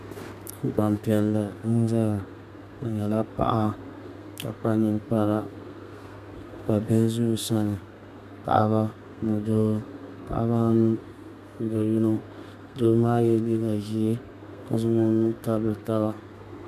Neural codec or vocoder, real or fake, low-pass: codec, 32 kHz, 1.9 kbps, SNAC; fake; 14.4 kHz